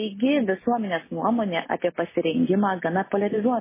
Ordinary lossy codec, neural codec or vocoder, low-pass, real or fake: MP3, 16 kbps; none; 3.6 kHz; real